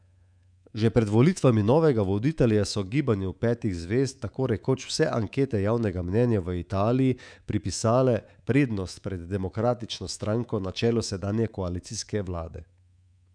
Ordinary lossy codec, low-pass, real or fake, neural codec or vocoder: none; 9.9 kHz; fake; codec, 24 kHz, 3.1 kbps, DualCodec